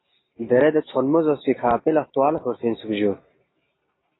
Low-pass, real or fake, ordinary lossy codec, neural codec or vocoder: 7.2 kHz; real; AAC, 16 kbps; none